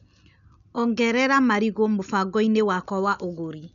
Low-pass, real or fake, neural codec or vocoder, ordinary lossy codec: 7.2 kHz; real; none; none